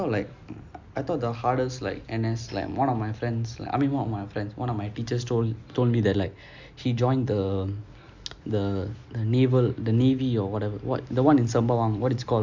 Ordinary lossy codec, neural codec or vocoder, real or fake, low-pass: MP3, 64 kbps; none; real; 7.2 kHz